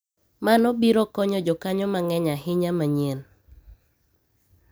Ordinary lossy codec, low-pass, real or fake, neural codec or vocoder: none; none; real; none